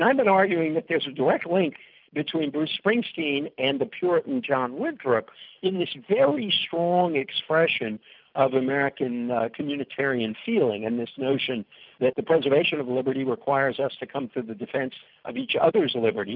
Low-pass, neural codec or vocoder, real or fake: 5.4 kHz; none; real